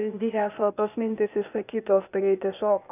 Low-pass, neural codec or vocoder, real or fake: 3.6 kHz; codec, 16 kHz, 0.8 kbps, ZipCodec; fake